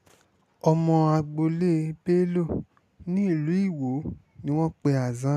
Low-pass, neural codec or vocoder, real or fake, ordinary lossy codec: 14.4 kHz; none; real; none